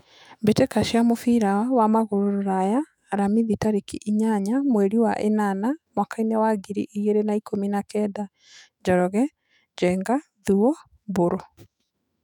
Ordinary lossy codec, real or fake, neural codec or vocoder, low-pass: none; fake; autoencoder, 48 kHz, 128 numbers a frame, DAC-VAE, trained on Japanese speech; 19.8 kHz